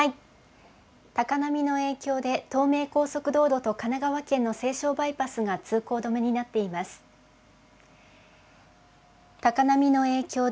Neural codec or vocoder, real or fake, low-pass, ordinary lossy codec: none; real; none; none